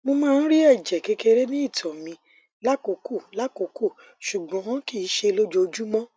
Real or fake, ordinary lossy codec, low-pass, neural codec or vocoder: real; none; none; none